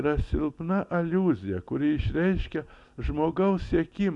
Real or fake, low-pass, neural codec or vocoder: real; 10.8 kHz; none